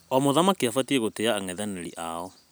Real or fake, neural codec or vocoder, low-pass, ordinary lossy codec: real; none; none; none